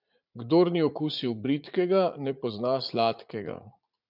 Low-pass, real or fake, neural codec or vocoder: 5.4 kHz; fake; vocoder, 44.1 kHz, 80 mel bands, Vocos